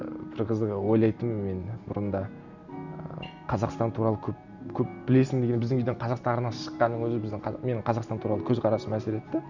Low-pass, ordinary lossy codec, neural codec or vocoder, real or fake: 7.2 kHz; none; none; real